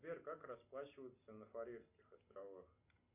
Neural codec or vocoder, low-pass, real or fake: none; 3.6 kHz; real